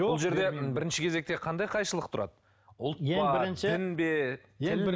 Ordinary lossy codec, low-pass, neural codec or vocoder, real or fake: none; none; none; real